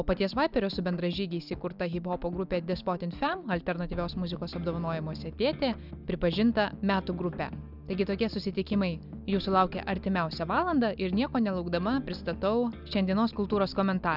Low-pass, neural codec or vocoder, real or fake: 5.4 kHz; none; real